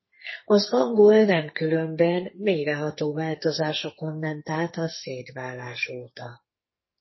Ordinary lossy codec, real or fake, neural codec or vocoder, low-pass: MP3, 24 kbps; fake; codec, 44.1 kHz, 2.6 kbps, SNAC; 7.2 kHz